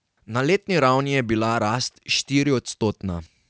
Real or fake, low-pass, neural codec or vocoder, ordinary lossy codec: real; none; none; none